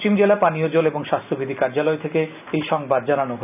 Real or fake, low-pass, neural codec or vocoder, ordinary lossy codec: real; 3.6 kHz; none; none